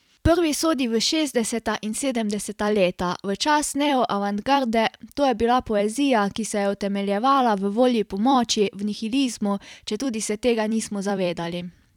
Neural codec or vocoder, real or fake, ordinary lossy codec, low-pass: vocoder, 44.1 kHz, 128 mel bands every 256 samples, BigVGAN v2; fake; none; 19.8 kHz